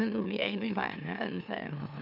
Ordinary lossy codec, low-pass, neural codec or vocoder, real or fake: none; 5.4 kHz; autoencoder, 44.1 kHz, a latent of 192 numbers a frame, MeloTTS; fake